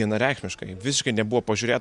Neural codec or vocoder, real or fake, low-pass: none; real; 10.8 kHz